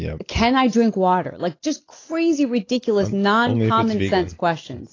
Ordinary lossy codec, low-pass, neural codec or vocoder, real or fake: AAC, 32 kbps; 7.2 kHz; none; real